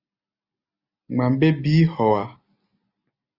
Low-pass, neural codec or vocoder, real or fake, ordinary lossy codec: 5.4 kHz; none; real; Opus, 64 kbps